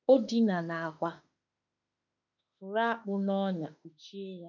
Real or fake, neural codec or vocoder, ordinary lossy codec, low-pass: fake; autoencoder, 48 kHz, 32 numbers a frame, DAC-VAE, trained on Japanese speech; none; 7.2 kHz